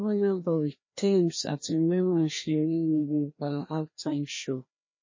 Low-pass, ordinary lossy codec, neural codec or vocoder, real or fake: 7.2 kHz; MP3, 32 kbps; codec, 16 kHz, 1 kbps, FreqCodec, larger model; fake